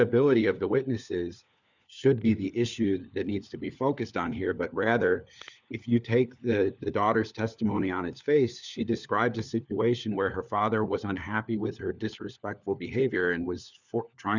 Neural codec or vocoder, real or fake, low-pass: codec, 16 kHz, 4 kbps, FunCodec, trained on LibriTTS, 50 frames a second; fake; 7.2 kHz